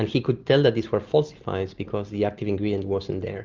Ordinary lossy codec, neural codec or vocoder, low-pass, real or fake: Opus, 24 kbps; none; 7.2 kHz; real